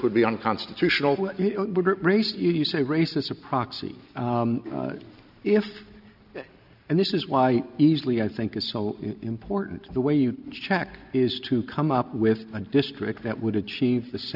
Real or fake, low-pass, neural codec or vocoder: real; 5.4 kHz; none